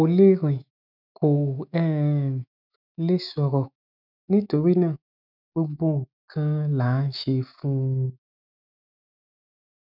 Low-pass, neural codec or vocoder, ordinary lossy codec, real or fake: 5.4 kHz; autoencoder, 48 kHz, 128 numbers a frame, DAC-VAE, trained on Japanese speech; none; fake